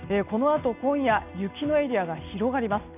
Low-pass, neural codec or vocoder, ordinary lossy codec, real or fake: 3.6 kHz; none; none; real